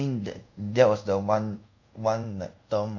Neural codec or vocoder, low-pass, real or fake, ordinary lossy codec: codec, 24 kHz, 0.5 kbps, DualCodec; 7.2 kHz; fake; none